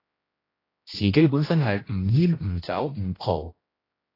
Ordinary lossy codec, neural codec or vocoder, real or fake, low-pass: AAC, 24 kbps; codec, 16 kHz, 1 kbps, X-Codec, HuBERT features, trained on general audio; fake; 5.4 kHz